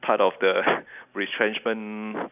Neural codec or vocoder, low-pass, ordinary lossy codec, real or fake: none; 3.6 kHz; none; real